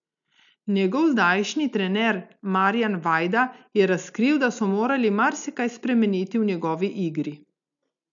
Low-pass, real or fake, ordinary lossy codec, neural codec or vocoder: 7.2 kHz; real; none; none